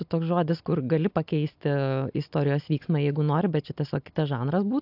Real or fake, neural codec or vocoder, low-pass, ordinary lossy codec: real; none; 5.4 kHz; AAC, 48 kbps